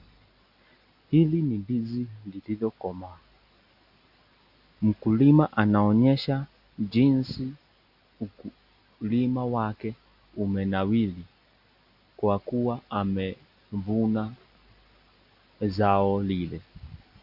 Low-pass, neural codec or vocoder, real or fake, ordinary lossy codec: 5.4 kHz; none; real; AAC, 48 kbps